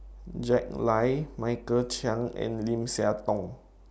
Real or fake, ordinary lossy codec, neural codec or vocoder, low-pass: real; none; none; none